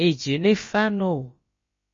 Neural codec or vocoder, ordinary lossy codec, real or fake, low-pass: codec, 16 kHz, about 1 kbps, DyCAST, with the encoder's durations; MP3, 32 kbps; fake; 7.2 kHz